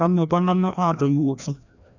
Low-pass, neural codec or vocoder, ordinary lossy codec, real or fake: 7.2 kHz; codec, 16 kHz, 1 kbps, FreqCodec, larger model; none; fake